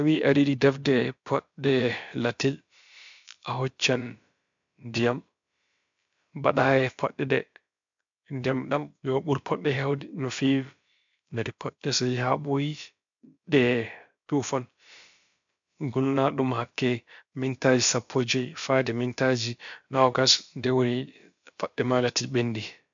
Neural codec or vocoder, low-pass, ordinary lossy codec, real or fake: codec, 16 kHz, about 1 kbps, DyCAST, with the encoder's durations; 7.2 kHz; MP3, 64 kbps; fake